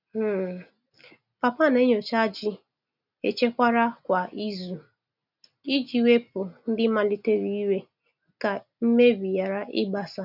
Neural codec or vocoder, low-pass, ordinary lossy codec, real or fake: none; 5.4 kHz; none; real